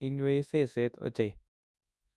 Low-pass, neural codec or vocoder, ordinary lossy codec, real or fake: none; codec, 24 kHz, 0.9 kbps, WavTokenizer, large speech release; none; fake